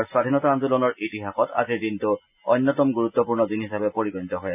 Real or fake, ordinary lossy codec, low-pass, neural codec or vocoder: real; none; 3.6 kHz; none